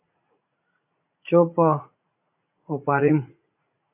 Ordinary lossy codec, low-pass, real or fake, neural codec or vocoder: AAC, 32 kbps; 3.6 kHz; fake; vocoder, 44.1 kHz, 80 mel bands, Vocos